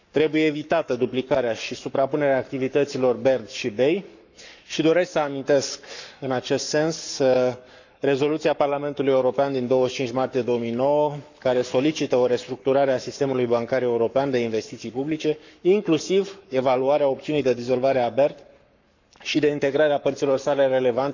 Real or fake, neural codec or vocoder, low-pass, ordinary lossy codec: fake; codec, 44.1 kHz, 7.8 kbps, Pupu-Codec; 7.2 kHz; none